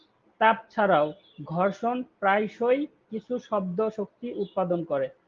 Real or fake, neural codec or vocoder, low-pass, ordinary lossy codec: real; none; 7.2 kHz; Opus, 32 kbps